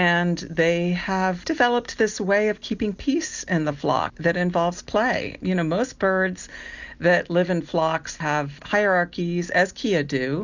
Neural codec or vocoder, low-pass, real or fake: none; 7.2 kHz; real